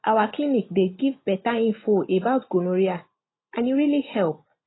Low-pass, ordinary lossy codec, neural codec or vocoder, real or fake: 7.2 kHz; AAC, 16 kbps; none; real